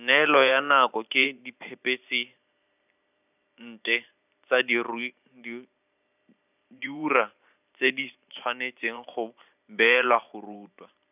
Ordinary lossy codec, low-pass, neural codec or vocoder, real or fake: none; 3.6 kHz; vocoder, 44.1 kHz, 128 mel bands every 512 samples, BigVGAN v2; fake